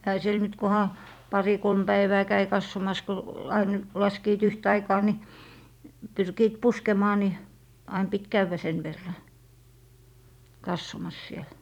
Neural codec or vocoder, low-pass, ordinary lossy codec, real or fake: vocoder, 44.1 kHz, 128 mel bands every 256 samples, BigVGAN v2; 19.8 kHz; none; fake